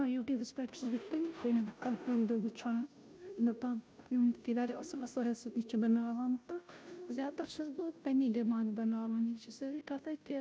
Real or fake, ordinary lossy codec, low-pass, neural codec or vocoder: fake; none; none; codec, 16 kHz, 0.5 kbps, FunCodec, trained on Chinese and English, 25 frames a second